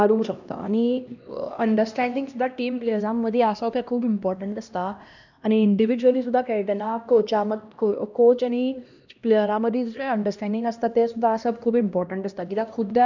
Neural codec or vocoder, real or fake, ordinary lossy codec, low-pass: codec, 16 kHz, 1 kbps, X-Codec, HuBERT features, trained on LibriSpeech; fake; none; 7.2 kHz